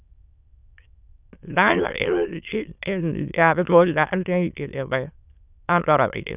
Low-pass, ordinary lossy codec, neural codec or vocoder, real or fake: 3.6 kHz; none; autoencoder, 22.05 kHz, a latent of 192 numbers a frame, VITS, trained on many speakers; fake